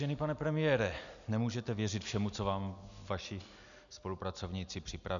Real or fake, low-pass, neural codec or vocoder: real; 7.2 kHz; none